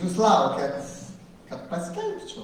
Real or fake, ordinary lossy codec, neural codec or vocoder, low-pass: real; Opus, 24 kbps; none; 19.8 kHz